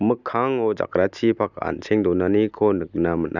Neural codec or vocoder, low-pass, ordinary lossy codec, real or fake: none; 7.2 kHz; none; real